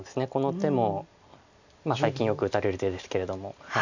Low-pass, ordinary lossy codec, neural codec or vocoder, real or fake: 7.2 kHz; none; none; real